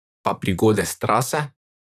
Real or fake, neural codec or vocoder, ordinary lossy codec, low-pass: fake; vocoder, 44.1 kHz, 128 mel bands, Pupu-Vocoder; none; 14.4 kHz